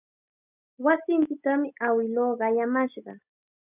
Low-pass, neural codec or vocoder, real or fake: 3.6 kHz; none; real